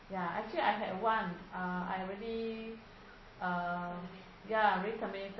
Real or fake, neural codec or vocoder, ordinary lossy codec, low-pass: real; none; MP3, 24 kbps; 7.2 kHz